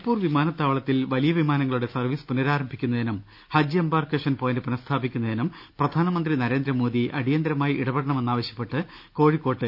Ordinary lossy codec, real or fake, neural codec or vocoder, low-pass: none; real; none; 5.4 kHz